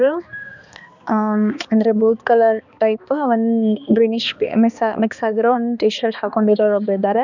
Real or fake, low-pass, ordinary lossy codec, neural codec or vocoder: fake; 7.2 kHz; none; codec, 16 kHz, 2 kbps, X-Codec, HuBERT features, trained on balanced general audio